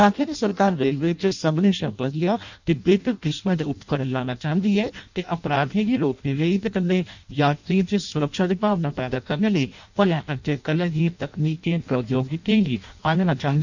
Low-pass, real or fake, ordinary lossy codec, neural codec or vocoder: 7.2 kHz; fake; none; codec, 16 kHz in and 24 kHz out, 0.6 kbps, FireRedTTS-2 codec